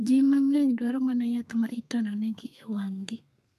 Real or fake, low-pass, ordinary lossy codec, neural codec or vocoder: fake; 14.4 kHz; none; codec, 32 kHz, 1.9 kbps, SNAC